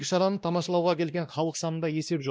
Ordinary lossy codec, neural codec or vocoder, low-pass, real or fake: none; codec, 16 kHz, 1 kbps, X-Codec, WavLM features, trained on Multilingual LibriSpeech; none; fake